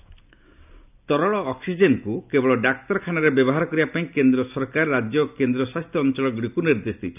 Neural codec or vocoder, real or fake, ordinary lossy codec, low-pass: none; real; none; 3.6 kHz